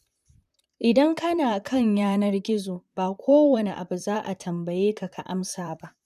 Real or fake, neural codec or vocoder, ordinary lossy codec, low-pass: fake; vocoder, 44.1 kHz, 128 mel bands, Pupu-Vocoder; MP3, 96 kbps; 14.4 kHz